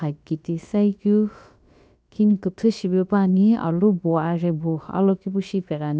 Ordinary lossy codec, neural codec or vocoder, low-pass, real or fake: none; codec, 16 kHz, about 1 kbps, DyCAST, with the encoder's durations; none; fake